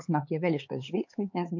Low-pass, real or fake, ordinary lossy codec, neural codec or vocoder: 7.2 kHz; fake; MP3, 48 kbps; codec, 16 kHz, 4 kbps, X-Codec, HuBERT features, trained on LibriSpeech